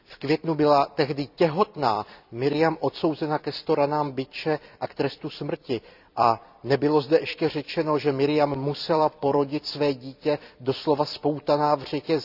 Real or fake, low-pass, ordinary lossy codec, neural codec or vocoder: fake; 5.4 kHz; none; vocoder, 44.1 kHz, 128 mel bands every 256 samples, BigVGAN v2